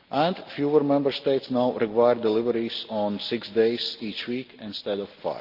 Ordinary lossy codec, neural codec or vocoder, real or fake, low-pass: Opus, 32 kbps; none; real; 5.4 kHz